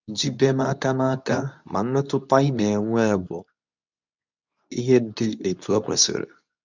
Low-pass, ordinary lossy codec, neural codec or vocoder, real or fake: 7.2 kHz; none; codec, 24 kHz, 0.9 kbps, WavTokenizer, medium speech release version 2; fake